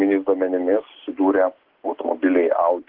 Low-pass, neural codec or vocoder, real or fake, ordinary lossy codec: 5.4 kHz; none; real; Opus, 16 kbps